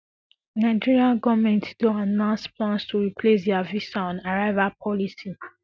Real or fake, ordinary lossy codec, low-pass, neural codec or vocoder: real; none; none; none